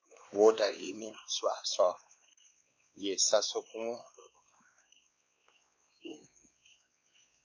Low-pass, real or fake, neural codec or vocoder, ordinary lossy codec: 7.2 kHz; fake; codec, 16 kHz, 2 kbps, X-Codec, WavLM features, trained on Multilingual LibriSpeech; AAC, 48 kbps